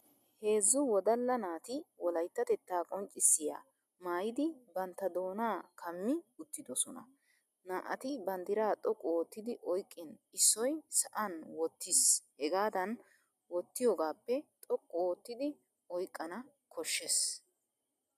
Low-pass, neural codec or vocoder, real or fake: 14.4 kHz; none; real